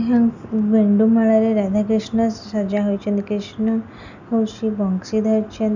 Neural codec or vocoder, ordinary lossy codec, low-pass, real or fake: none; none; 7.2 kHz; real